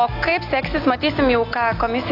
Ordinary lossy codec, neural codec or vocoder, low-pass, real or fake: AAC, 32 kbps; none; 5.4 kHz; real